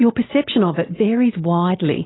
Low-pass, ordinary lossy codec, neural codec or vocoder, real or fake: 7.2 kHz; AAC, 16 kbps; none; real